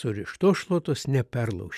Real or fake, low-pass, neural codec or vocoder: real; 14.4 kHz; none